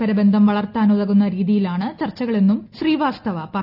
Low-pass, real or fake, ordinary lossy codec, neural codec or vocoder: 5.4 kHz; real; none; none